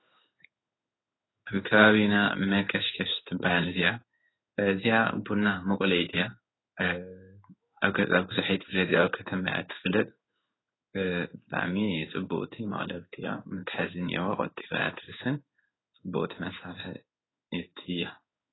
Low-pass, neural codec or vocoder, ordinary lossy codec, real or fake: 7.2 kHz; codec, 16 kHz in and 24 kHz out, 1 kbps, XY-Tokenizer; AAC, 16 kbps; fake